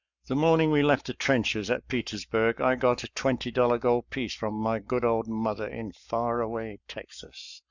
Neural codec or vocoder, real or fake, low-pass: codec, 44.1 kHz, 7.8 kbps, Pupu-Codec; fake; 7.2 kHz